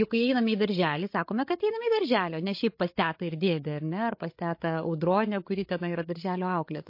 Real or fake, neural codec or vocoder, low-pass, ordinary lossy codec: fake; codec, 16 kHz, 16 kbps, FreqCodec, larger model; 5.4 kHz; MP3, 32 kbps